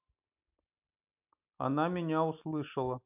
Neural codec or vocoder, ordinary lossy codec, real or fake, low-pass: none; none; real; 3.6 kHz